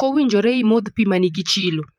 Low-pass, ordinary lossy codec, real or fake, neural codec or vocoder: 19.8 kHz; MP3, 96 kbps; fake; vocoder, 44.1 kHz, 128 mel bands, Pupu-Vocoder